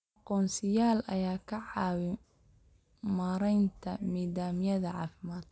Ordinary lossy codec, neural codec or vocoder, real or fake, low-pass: none; none; real; none